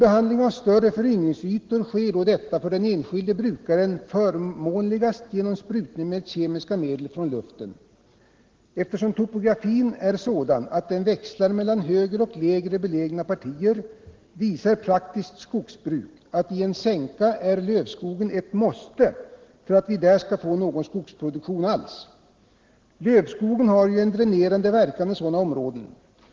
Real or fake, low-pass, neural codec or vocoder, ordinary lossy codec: real; 7.2 kHz; none; Opus, 16 kbps